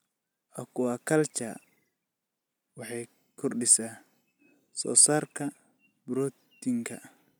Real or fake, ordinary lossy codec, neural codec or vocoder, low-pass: real; none; none; none